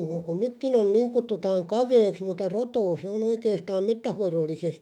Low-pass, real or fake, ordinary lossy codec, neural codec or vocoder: 19.8 kHz; fake; none; autoencoder, 48 kHz, 32 numbers a frame, DAC-VAE, trained on Japanese speech